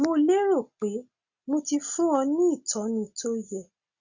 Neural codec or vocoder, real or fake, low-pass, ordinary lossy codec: none; real; 7.2 kHz; Opus, 64 kbps